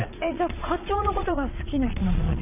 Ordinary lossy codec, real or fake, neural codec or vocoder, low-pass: none; fake; vocoder, 22.05 kHz, 80 mel bands, Vocos; 3.6 kHz